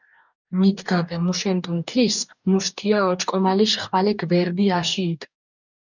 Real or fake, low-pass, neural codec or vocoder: fake; 7.2 kHz; codec, 44.1 kHz, 2.6 kbps, DAC